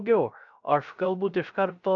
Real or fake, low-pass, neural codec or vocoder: fake; 7.2 kHz; codec, 16 kHz, 0.3 kbps, FocalCodec